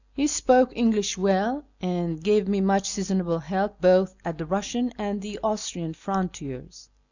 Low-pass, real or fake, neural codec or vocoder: 7.2 kHz; real; none